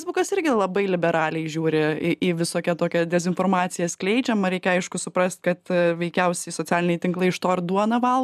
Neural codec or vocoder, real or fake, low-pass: none; real; 14.4 kHz